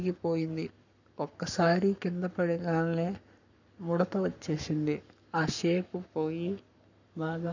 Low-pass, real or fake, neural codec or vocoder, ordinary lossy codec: 7.2 kHz; fake; codec, 44.1 kHz, 7.8 kbps, Pupu-Codec; none